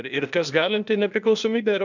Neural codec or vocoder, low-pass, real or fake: codec, 16 kHz, 0.8 kbps, ZipCodec; 7.2 kHz; fake